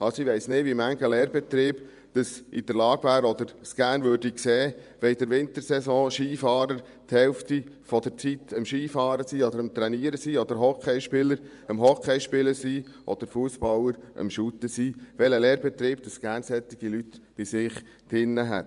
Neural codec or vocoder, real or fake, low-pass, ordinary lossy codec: vocoder, 24 kHz, 100 mel bands, Vocos; fake; 10.8 kHz; none